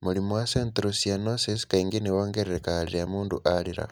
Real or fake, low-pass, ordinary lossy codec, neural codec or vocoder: real; none; none; none